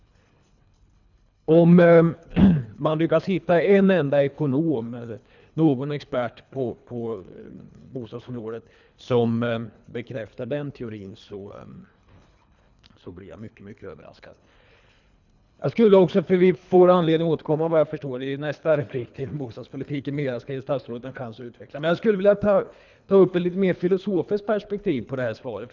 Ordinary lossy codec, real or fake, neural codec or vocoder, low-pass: none; fake; codec, 24 kHz, 3 kbps, HILCodec; 7.2 kHz